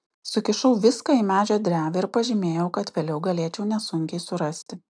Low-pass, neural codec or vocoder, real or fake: 9.9 kHz; none; real